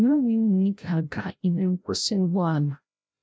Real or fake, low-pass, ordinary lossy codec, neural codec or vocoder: fake; none; none; codec, 16 kHz, 0.5 kbps, FreqCodec, larger model